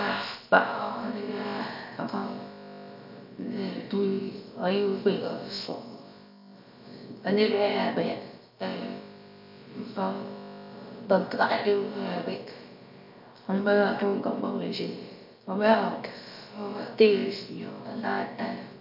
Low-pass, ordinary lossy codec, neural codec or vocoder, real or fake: 5.4 kHz; MP3, 48 kbps; codec, 16 kHz, about 1 kbps, DyCAST, with the encoder's durations; fake